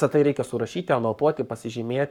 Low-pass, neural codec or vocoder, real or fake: 19.8 kHz; codec, 44.1 kHz, 7.8 kbps, Pupu-Codec; fake